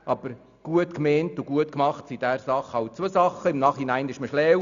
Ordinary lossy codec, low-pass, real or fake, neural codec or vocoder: none; 7.2 kHz; real; none